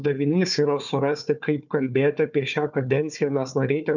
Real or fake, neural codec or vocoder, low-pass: fake; codec, 16 kHz, 4 kbps, FunCodec, trained on Chinese and English, 50 frames a second; 7.2 kHz